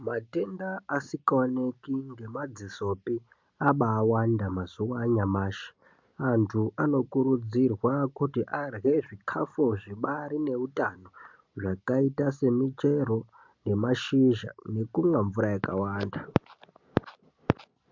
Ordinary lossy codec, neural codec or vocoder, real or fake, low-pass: MP3, 64 kbps; none; real; 7.2 kHz